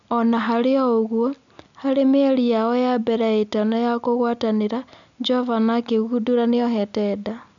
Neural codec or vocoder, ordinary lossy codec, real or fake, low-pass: none; none; real; 7.2 kHz